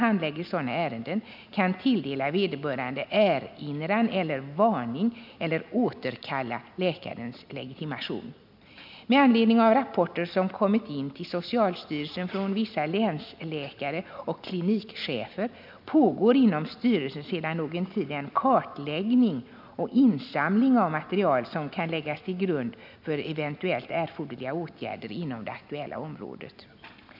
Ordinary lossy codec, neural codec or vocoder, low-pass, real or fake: none; none; 5.4 kHz; real